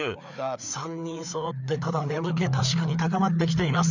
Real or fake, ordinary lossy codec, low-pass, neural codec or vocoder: fake; none; 7.2 kHz; codec, 16 kHz, 4 kbps, FreqCodec, larger model